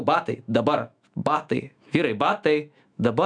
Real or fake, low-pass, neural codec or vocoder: fake; 9.9 kHz; autoencoder, 48 kHz, 128 numbers a frame, DAC-VAE, trained on Japanese speech